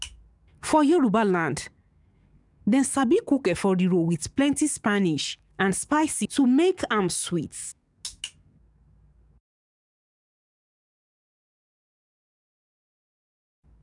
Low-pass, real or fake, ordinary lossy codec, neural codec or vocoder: 10.8 kHz; fake; none; codec, 44.1 kHz, 7.8 kbps, DAC